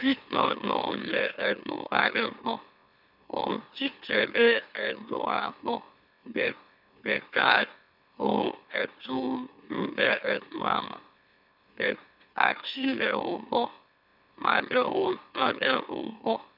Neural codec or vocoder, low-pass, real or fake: autoencoder, 44.1 kHz, a latent of 192 numbers a frame, MeloTTS; 5.4 kHz; fake